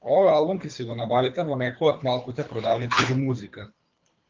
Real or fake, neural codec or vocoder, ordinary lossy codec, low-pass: fake; codec, 24 kHz, 3 kbps, HILCodec; Opus, 24 kbps; 7.2 kHz